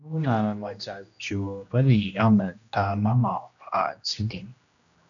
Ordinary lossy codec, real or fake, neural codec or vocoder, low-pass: MP3, 96 kbps; fake; codec, 16 kHz, 1 kbps, X-Codec, HuBERT features, trained on general audio; 7.2 kHz